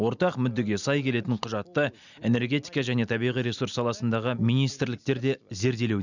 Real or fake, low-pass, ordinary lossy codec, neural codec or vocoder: real; 7.2 kHz; none; none